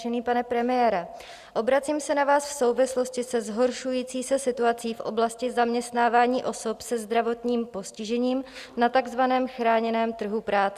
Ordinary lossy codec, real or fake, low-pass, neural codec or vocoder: Opus, 64 kbps; real; 14.4 kHz; none